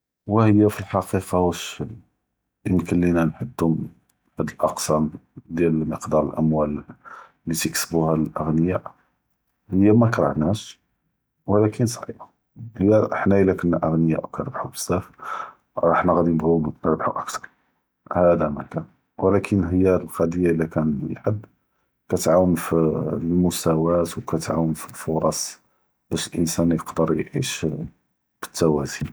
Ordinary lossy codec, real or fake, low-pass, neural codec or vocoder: none; real; none; none